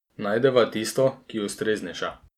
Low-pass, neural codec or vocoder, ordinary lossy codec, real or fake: 19.8 kHz; none; none; real